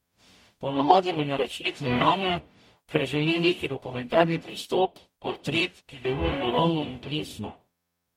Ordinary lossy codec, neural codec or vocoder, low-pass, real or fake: MP3, 64 kbps; codec, 44.1 kHz, 0.9 kbps, DAC; 19.8 kHz; fake